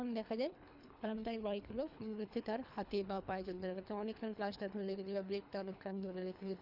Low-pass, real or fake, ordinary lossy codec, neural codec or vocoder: 5.4 kHz; fake; none; codec, 24 kHz, 3 kbps, HILCodec